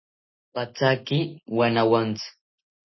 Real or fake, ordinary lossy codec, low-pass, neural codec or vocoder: real; MP3, 24 kbps; 7.2 kHz; none